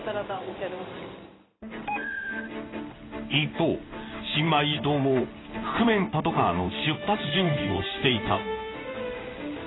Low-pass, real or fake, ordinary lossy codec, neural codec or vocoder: 7.2 kHz; fake; AAC, 16 kbps; codec, 16 kHz in and 24 kHz out, 1 kbps, XY-Tokenizer